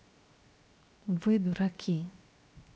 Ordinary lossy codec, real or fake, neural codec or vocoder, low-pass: none; fake; codec, 16 kHz, 0.7 kbps, FocalCodec; none